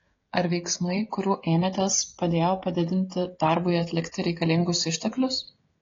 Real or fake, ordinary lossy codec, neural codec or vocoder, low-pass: fake; AAC, 32 kbps; codec, 16 kHz, 16 kbps, FreqCodec, smaller model; 7.2 kHz